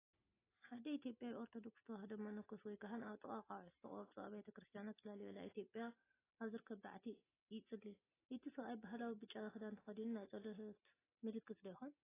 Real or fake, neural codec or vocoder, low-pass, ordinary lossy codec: real; none; 3.6 kHz; AAC, 16 kbps